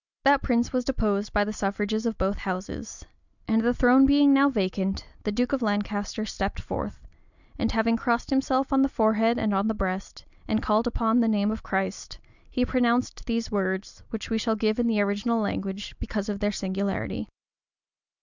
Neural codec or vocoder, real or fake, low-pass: none; real; 7.2 kHz